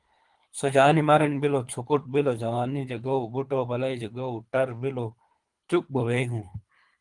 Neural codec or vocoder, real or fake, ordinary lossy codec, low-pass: codec, 24 kHz, 3 kbps, HILCodec; fake; Opus, 32 kbps; 10.8 kHz